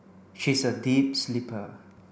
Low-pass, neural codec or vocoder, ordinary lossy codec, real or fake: none; none; none; real